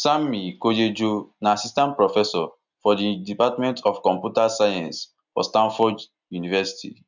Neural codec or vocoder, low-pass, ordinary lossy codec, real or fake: none; 7.2 kHz; none; real